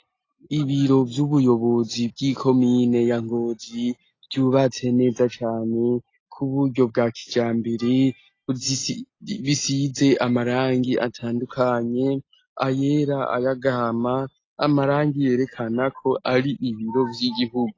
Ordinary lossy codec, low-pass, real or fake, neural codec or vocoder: AAC, 32 kbps; 7.2 kHz; real; none